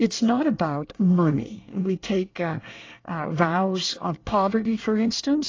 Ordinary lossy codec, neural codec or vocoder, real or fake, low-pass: AAC, 32 kbps; codec, 24 kHz, 1 kbps, SNAC; fake; 7.2 kHz